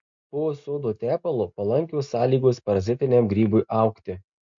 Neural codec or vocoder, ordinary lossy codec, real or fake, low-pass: none; MP3, 48 kbps; real; 7.2 kHz